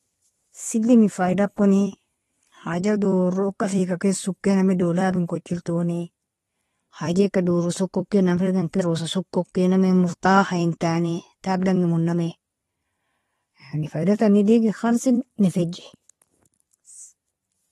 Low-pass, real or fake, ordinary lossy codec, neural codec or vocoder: 19.8 kHz; fake; AAC, 32 kbps; vocoder, 44.1 kHz, 128 mel bands every 256 samples, BigVGAN v2